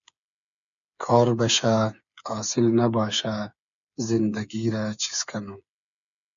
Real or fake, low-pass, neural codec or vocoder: fake; 7.2 kHz; codec, 16 kHz, 8 kbps, FreqCodec, smaller model